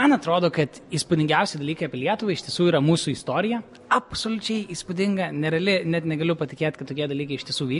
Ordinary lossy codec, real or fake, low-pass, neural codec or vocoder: MP3, 48 kbps; real; 14.4 kHz; none